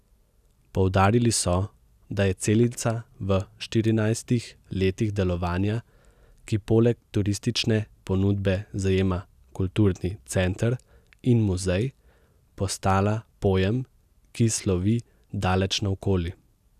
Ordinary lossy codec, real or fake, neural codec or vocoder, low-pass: none; real; none; 14.4 kHz